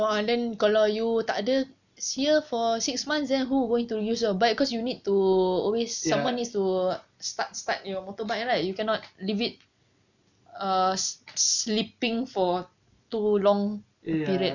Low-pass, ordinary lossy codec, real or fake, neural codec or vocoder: 7.2 kHz; none; real; none